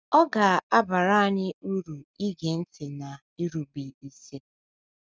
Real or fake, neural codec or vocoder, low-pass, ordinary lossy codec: real; none; none; none